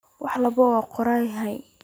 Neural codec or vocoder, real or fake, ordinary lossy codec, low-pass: none; real; none; none